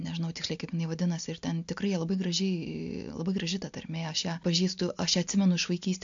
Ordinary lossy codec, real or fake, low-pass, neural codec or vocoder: AAC, 64 kbps; real; 7.2 kHz; none